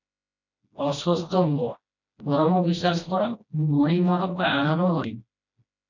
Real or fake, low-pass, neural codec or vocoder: fake; 7.2 kHz; codec, 16 kHz, 1 kbps, FreqCodec, smaller model